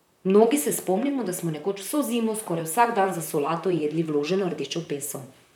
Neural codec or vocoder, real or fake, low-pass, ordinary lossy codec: vocoder, 44.1 kHz, 128 mel bands, Pupu-Vocoder; fake; 19.8 kHz; none